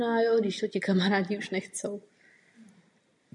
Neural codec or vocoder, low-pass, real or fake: none; 10.8 kHz; real